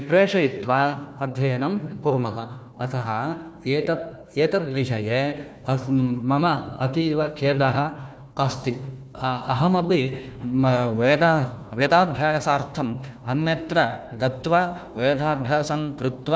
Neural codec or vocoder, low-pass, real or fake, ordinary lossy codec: codec, 16 kHz, 1 kbps, FunCodec, trained on Chinese and English, 50 frames a second; none; fake; none